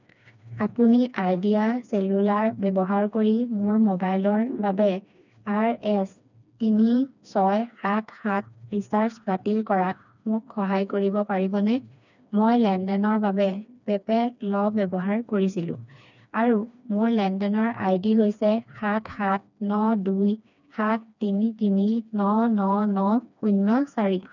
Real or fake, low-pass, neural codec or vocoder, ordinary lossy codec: fake; 7.2 kHz; codec, 16 kHz, 2 kbps, FreqCodec, smaller model; none